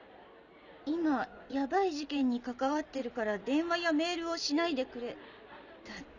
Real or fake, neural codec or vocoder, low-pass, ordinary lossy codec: real; none; 7.2 kHz; none